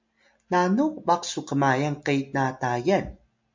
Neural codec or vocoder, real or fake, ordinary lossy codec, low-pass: none; real; MP3, 64 kbps; 7.2 kHz